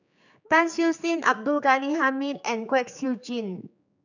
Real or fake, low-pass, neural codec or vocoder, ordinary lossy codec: fake; 7.2 kHz; codec, 16 kHz, 4 kbps, X-Codec, HuBERT features, trained on general audio; none